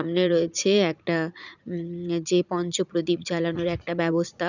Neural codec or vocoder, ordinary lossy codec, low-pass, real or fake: vocoder, 44.1 kHz, 80 mel bands, Vocos; none; 7.2 kHz; fake